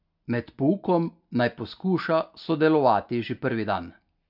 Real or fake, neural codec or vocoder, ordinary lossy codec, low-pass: real; none; MP3, 48 kbps; 5.4 kHz